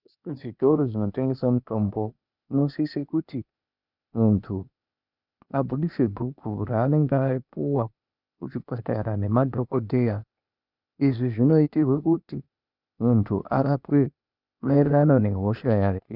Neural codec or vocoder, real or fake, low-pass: codec, 16 kHz, 0.8 kbps, ZipCodec; fake; 5.4 kHz